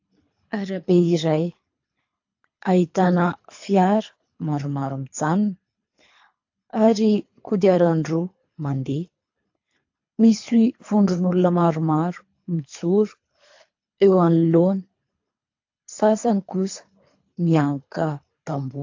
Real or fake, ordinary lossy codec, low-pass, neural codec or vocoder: fake; AAC, 48 kbps; 7.2 kHz; codec, 24 kHz, 3 kbps, HILCodec